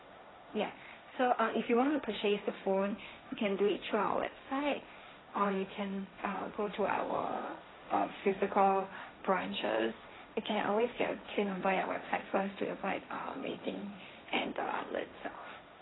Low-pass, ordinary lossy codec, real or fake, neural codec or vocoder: 7.2 kHz; AAC, 16 kbps; fake; codec, 16 kHz, 1.1 kbps, Voila-Tokenizer